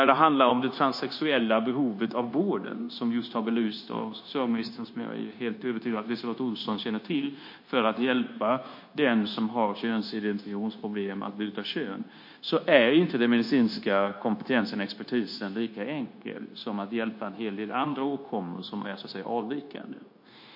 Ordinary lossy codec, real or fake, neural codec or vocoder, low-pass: MP3, 32 kbps; fake; codec, 16 kHz, 0.9 kbps, LongCat-Audio-Codec; 5.4 kHz